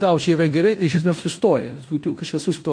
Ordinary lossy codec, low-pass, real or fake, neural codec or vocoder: MP3, 48 kbps; 9.9 kHz; fake; codec, 16 kHz in and 24 kHz out, 0.9 kbps, LongCat-Audio-Codec, fine tuned four codebook decoder